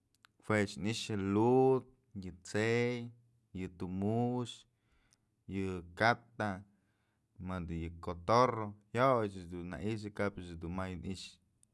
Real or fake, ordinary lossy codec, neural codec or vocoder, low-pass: real; none; none; none